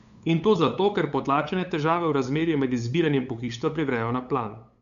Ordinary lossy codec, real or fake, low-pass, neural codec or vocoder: none; fake; 7.2 kHz; codec, 16 kHz, 8 kbps, FunCodec, trained on LibriTTS, 25 frames a second